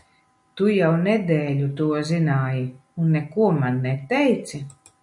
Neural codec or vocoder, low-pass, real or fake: none; 10.8 kHz; real